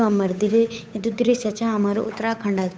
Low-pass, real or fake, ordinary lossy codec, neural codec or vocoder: none; fake; none; codec, 16 kHz, 8 kbps, FunCodec, trained on Chinese and English, 25 frames a second